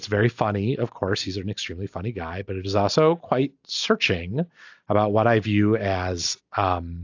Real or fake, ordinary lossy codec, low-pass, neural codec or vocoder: real; AAC, 48 kbps; 7.2 kHz; none